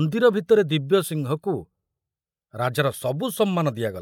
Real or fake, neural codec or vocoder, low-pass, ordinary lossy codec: real; none; 19.8 kHz; MP3, 96 kbps